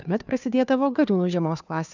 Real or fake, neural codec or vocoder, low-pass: fake; codec, 16 kHz, 2 kbps, FunCodec, trained on Chinese and English, 25 frames a second; 7.2 kHz